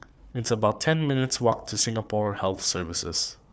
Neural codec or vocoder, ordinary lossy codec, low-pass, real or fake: codec, 16 kHz, 4 kbps, FunCodec, trained on Chinese and English, 50 frames a second; none; none; fake